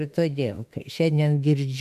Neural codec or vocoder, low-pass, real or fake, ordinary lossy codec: autoencoder, 48 kHz, 32 numbers a frame, DAC-VAE, trained on Japanese speech; 14.4 kHz; fake; MP3, 96 kbps